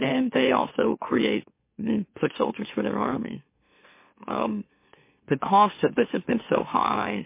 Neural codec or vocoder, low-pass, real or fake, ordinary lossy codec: autoencoder, 44.1 kHz, a latent of 192 numbers a frame, MeloTTS; 3.6 kHz; fake; MP3, 24 kbps